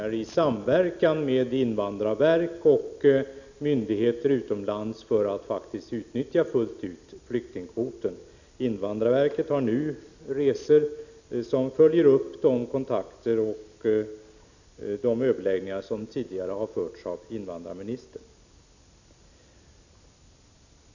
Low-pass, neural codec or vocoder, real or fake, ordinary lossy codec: 7.2 kHz; none; real; none